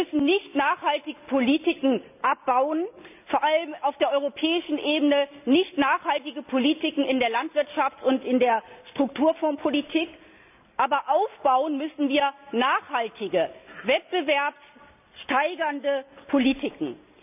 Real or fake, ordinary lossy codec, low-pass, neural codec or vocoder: real; none; 3.6 kHz; none